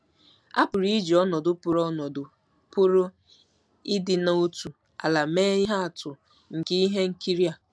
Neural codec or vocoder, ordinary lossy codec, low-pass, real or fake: none; none; none; real